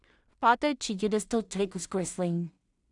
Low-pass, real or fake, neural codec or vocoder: 10.8 kHz; fake; codec, 16 kHz in and 24 kHz out, 0.4 kbps, LongCat-Audio-Codec, two codebook decoder